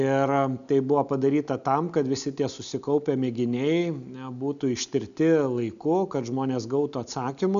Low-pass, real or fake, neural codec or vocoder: 7.2 kHz; real; none